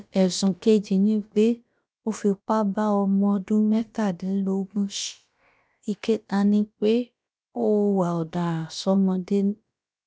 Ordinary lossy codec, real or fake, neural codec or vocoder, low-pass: none; fake; codec, 16 kHz, about 1 kbps, DyCAST, with the encoder's durations; none